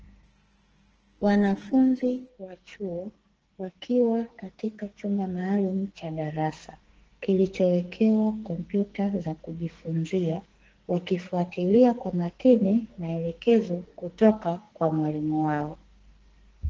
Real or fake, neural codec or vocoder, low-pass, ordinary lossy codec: fake; codec, 44.1 kHz, 2.6 kbps, SNAC; 7.2 kHz; Opus, 16 kbps